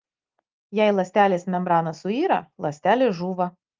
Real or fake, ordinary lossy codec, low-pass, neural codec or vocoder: real; Opus, 32 kbps; 7.2 kHz; none